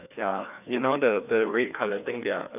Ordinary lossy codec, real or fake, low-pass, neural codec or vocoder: none; fake; 3.6 kHz; codec, 16 kHz, 2 kbps, FreqCodec, larger model